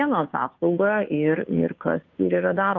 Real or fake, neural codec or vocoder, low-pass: fake; codec, 16 kHz, 2 kbps, FunCodec, trained on Chinese and English, 25 frames a second; 7.2 kHz